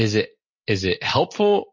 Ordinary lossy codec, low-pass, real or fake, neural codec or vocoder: MP3, 32 kbps; 7.2 kHz; real; none